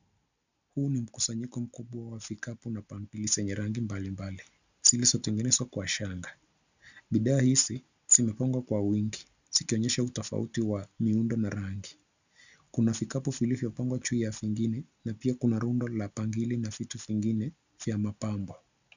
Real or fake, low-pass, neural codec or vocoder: real; 7.2 kHz; none